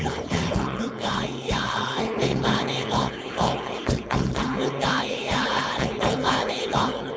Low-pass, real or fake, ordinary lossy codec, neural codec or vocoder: none; fake; none; codec, 16 kHz, 4.8 kbps, FACodec